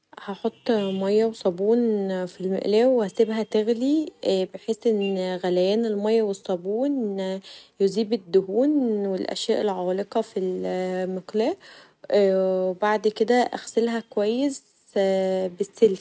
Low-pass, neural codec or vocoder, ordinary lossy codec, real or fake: none; none; none; real